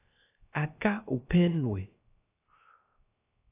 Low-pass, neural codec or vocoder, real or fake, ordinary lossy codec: 3.6 kHz; codec, 16 kHz, 0.3 kbps, FocalCodec; fake; AAC, 24 kbps